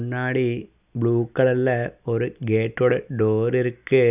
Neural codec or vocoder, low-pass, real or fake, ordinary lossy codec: none; 3.6 kHz; real; none